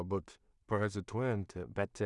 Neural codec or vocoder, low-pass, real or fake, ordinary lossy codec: codec, 16 kHz in and 24 kHz out, 0.4 kbps, LongCat-Audio-Codec, two codebook decoder; 10.8 kHz; fake; Opus, 64 kbps